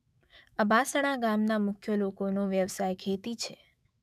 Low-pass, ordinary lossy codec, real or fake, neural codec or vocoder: 14.4 kHz; none; fake; autoencoder, 48 kHz, 128 numbers a frame, DAC-VAE, trained on Japanese speech